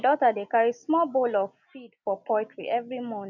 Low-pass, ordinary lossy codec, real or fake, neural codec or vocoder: 7.2 kHz; none; real; none